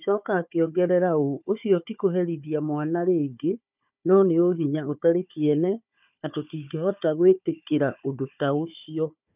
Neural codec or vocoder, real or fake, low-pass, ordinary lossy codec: codec, 16 kHz, 4 kbps, FunCodec, trained on Chinese and English, 50 frames a second; fake; 3.6 kHz; none